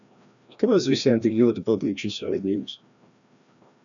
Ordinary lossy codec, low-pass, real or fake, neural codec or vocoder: MP3, 96 kbps; 7.2 kHz; fake; codec, 16 kHz, 1 kbps, FreqCodec, larger model